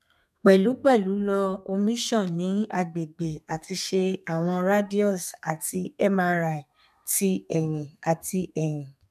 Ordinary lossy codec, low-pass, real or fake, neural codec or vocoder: none; 14.4 kHz; fake; codec, 32 kHz, 1.9 kbps, SNAC